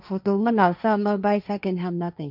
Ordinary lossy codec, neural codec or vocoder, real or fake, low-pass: none; codec, 16 kHz, 1.1 kbps, Voila-Tokenizer; fake; 5.4 kHz